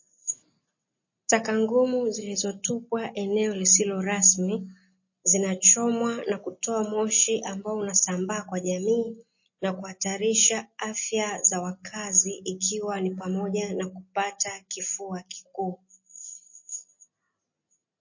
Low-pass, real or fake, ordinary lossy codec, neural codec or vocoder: 7.2 kHz; real; MP3, 32 kbps; none